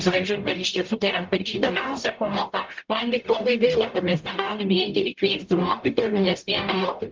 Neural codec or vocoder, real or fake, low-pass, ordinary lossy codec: codec, 44.1 kHz, 0.9 kbps, DAC; fake; 7.2 kHz; Opus, 32 kbps